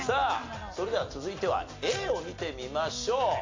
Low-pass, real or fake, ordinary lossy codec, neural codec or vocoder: 7.2 kHz; real; MP3, 48 kbps; none